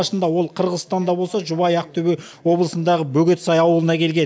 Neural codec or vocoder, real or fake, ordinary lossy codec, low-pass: none; real; none; none